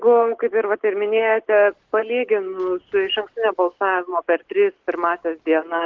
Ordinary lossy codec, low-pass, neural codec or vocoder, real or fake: Opus, 16 kbps; 7.2 kHz; none; real